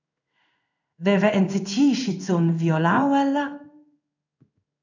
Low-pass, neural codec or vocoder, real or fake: 7.2 kHz; codec, 16 kHz in and 24 kHz out, 1 kbps, XY-Tokenizer; fake